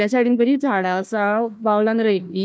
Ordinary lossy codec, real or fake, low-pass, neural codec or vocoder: none; fake; none; codec, 16 kHz, 1 kbps, FunCodec, trained on Chinese and English, 50 frames a second